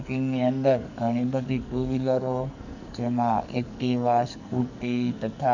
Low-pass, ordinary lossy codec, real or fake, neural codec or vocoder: 7.2 kHz; none; fake; codec, 44.1 kHz, 2.6 kbps, SNAC